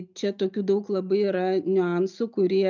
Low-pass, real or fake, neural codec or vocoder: 7.2 kHz; real; none